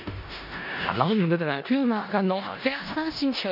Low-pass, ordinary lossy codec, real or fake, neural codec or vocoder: 5.4 kHz; none; fake; codec, 16 kHz in and 24 kHz out, 0.4 kbps, LongCat-Audio-Codec, four codebook decoder